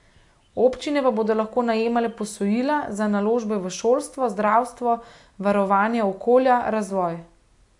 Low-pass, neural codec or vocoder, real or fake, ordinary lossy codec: 10.8 kHz; none; real; AAC, 64 kbps